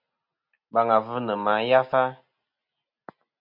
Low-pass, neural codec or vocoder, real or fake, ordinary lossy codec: 5.4 kHz; none; real; MP3, 48 kbps